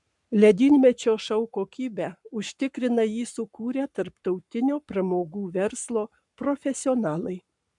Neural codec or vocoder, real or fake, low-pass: codec, 44.1 kHz, 7.8 kbps, Pupu-Codec; fake; 10.8 kHz